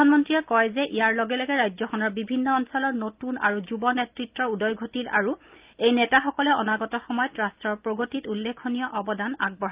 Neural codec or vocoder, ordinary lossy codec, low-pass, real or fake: none; Opus, 24 kbps; 3.6 kHz; real